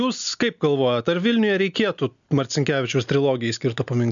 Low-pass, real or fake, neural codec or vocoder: 7.2 kHz; real; none